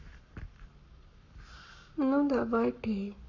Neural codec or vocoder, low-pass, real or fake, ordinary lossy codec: codec, 44.1 kHz, 7.8 kbps, Pupu-Codec; 7.2 kHz; fake; none